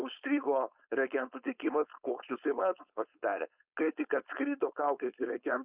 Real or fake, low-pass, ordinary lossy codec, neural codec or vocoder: fake; 3.6 kHz; Opus, 64 kbps; codec, 16 kHz, 4.8 kbps, FACodec